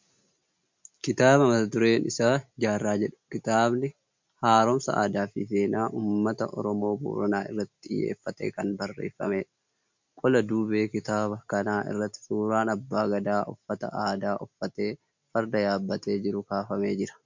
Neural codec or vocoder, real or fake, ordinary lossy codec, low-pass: none; real; MP3, 64 kbps; 7.2 kHz